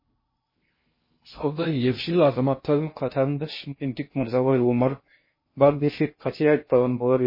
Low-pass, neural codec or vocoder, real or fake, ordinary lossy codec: 5.4 kHz; codec, 16 kHz in and 24 kHz out, 0.6 kbps, FocalCodec, streaming, 2048 codes; fake; MP3, 24 kbps